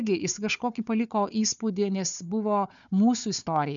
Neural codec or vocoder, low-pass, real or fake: codec, 16 kHz, 4 kbps, FunCodec, trained on Chinese and English, 50 frames a second; 7.2 kHz; fake